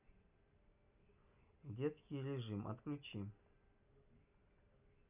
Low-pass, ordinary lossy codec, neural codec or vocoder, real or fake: 3.6 kHz; none; none; real